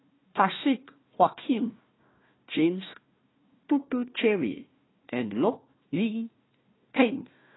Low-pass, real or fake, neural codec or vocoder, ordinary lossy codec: 7.2 kHz; fake; codec, 16 kHz, 1 kbps, FunCodec, trained on Chinese and English, 50 frames a second; AAC, 16 kbps